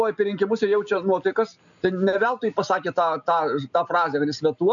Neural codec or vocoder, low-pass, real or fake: none; 7.2 kHz; real